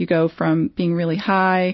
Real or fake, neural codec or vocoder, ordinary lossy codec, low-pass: real; none; MP3, 24 kbps; 7.2 kHz